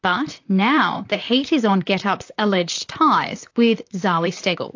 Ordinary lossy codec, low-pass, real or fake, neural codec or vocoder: AAC, 48 kbps; 7.2 kHz; fake; vocoder, 44.1 kHz, 128 mel bands, Pupu-Vocoder